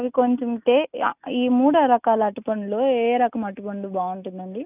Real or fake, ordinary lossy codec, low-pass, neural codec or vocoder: real; none; 3.6 kHz; none